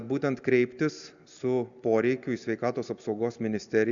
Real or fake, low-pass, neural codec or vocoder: real; 7.2 kHz; none